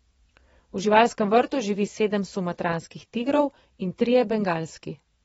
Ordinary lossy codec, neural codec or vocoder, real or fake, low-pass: AAC, 24 kbps; vocoder, 22.05 kHz, 80 mel bands, WaveNeXt; fake; 9.9 kHz